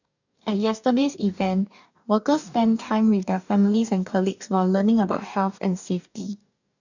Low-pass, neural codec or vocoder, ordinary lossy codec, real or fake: 7.2 kHz; codec, 44.1 kHz, 2.6 kbps, DAC; none; fake